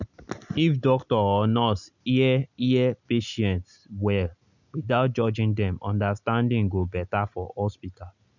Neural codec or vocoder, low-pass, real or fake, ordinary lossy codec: none; 7.2 kHz; real; none